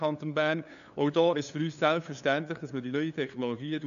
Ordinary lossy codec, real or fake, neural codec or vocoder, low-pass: none; fake; codec, 16 kHz, 2 kbps, FunCodec, trained on LibriTTS, 25 frames a second; 7.2 kHz